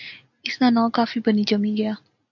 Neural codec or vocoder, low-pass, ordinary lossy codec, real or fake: none; 7.2 kHz; AAC, 48 kbps; real